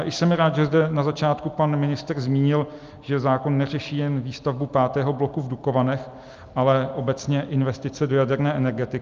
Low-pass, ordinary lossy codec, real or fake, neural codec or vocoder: 7.2 kHz; Opus, 24 kbps; real; none